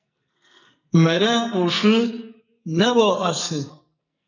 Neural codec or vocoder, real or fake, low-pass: codec, 44.1 kHz, 2.6 kbps, SNAC; fake; 7.2 kHz